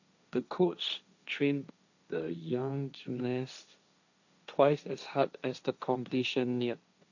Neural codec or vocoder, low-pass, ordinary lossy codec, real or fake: codec, 16 kHz, 1.1 kbps, Voila-Tokenizer; 7.2 kHz; none; fake